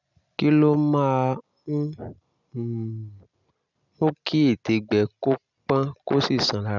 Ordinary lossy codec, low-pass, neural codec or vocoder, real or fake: none; 7.2 kHz; none; real